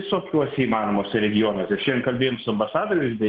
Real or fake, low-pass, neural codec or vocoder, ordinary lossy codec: real; 7.2 kHz; none; Opus, 16 kbps